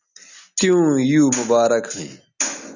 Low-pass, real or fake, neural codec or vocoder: 7.2 kHz; real; none